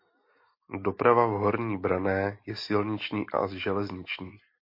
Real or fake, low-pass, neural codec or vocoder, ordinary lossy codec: real; 5.4 kHz; none; MP3, 32 kbps